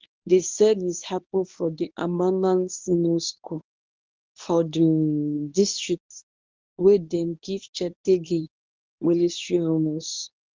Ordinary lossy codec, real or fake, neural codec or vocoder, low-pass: Opus, 32 kbps; fake; codec, 24 kHz, 0.9 kbps, WavTokenizer, medium speech release version 1; 7.2 kHz